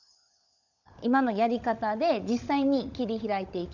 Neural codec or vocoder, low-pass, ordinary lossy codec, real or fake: codec, 16 kHz, 16 kbps, FunCodec, trained on LibriTTS, 50 frames a second; 7.2 kHz; none; fake